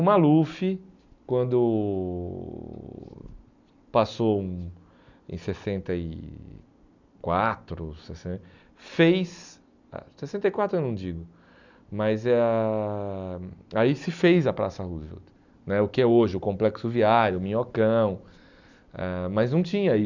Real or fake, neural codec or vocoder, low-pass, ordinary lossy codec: real; none; 7.2 kHz; none